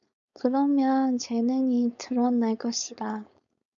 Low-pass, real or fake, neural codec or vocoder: 7.2 kHz; fake; codec, 16 kHz, 4.8 kbps, FACodec